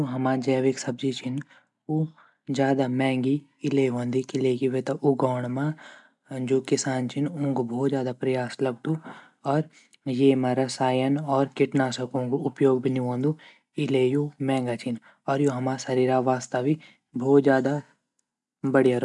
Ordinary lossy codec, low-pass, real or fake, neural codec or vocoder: MP3, 96 kbps; 10.8 kHz; real; none